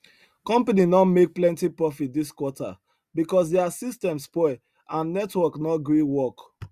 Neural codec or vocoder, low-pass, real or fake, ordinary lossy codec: none; 14.4 kHz; real; Opus, 64 kbps